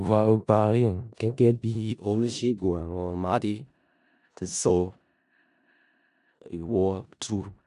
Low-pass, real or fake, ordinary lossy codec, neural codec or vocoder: 10.8 kHz; fake; none; codec, 16 kHz in and 24 kHz out, 0.4 kbps, LongCat-Audio-Codec, four codebook decoder